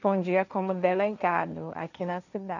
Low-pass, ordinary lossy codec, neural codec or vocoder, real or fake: 7.2 kHz; none; codec, 16 kHz, 1.1 kbps, Voila-Tokenizer; fake